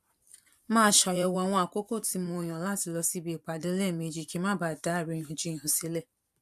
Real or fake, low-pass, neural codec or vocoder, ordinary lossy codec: fake; 14.4 kHz; vocoder, 44.1 kHz, 128 mel bands every 512 samples, BigVGAN v2; AAC, 96 kbps